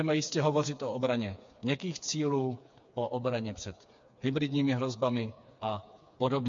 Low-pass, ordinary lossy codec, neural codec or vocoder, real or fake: 7.2 kHz; MP3, 48 kbps; codec, 16 kHz, 4 kbps, FreqCodec, smaller model; fake